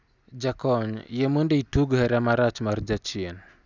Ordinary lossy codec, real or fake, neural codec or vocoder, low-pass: none; real; none; 7.2 kHz